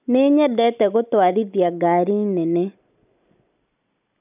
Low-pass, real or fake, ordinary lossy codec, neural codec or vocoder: 3.6 kHz; real; none; none